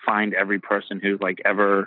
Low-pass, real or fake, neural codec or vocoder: 5.4 kHz; real; none